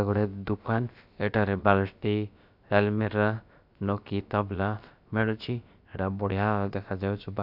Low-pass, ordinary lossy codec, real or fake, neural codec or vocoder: 5.4 kHz; none; fake; codec, 16 kHz, about 1 kbps, DyCAST, with the encoder's durations